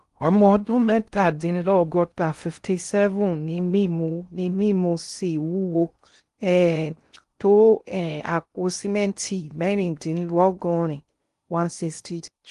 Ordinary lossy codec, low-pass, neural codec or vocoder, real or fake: Opus, 24 kbps; 10.8 kHz; codec, 16 kHz in and 24 kHz out, 0.6 kbps, FocalCodec, streaming, 2048 codes; fake